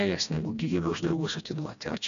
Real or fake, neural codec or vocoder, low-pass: fake; codec, 16 kHz, 1 kbps, FreqCodec, smaller model; 7.2 kHz